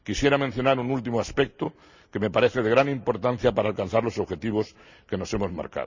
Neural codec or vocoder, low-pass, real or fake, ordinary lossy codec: none; 7.2 kHz; real; Opus, 64 kbps